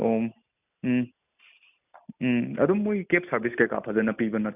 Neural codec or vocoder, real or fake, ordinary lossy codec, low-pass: none; real; none; 3.6 kHz